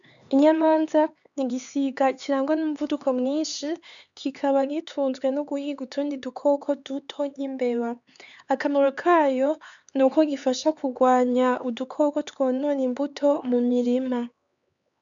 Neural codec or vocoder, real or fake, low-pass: codec, 16 kHz, 4 kbps, X-Codec, HuBERT features, trained on LibriSpeech; fake; 7.2 kHz